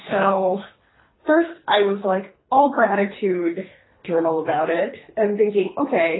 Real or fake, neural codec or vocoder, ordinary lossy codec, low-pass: fake; codec, 24 kHz, 3 kbps, HILCodec; AAC, 16 kbps; 7.2 kHz